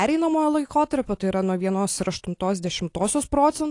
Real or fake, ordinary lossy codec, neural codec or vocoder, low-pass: real; AAC, 48 kbps; none; 10.8 kHz